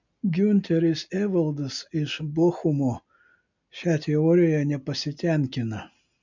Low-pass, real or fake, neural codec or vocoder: 7.2 kHz; real; none